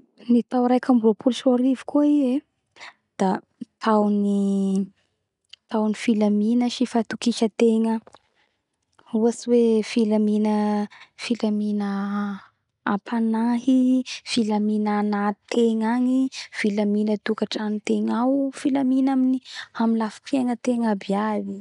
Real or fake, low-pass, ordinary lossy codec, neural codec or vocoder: real; 10.8 kHz; none; none